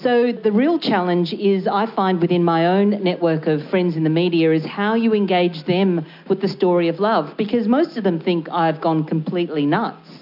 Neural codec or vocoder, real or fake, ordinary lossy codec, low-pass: none; real; MP3, 48 kbps; 5.4 kHz